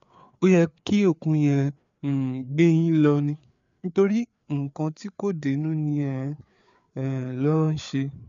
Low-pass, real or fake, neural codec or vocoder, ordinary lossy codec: 7.2 kHz; fake; codec, 16 kHz, 4 kbps, FreqCodec, larger model; MP3, 96 kbps